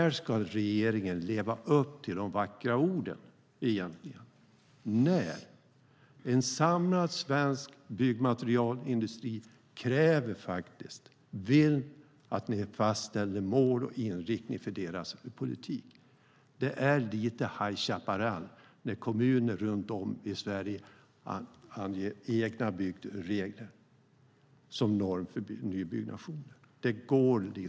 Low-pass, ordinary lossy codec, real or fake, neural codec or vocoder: none; none; real; none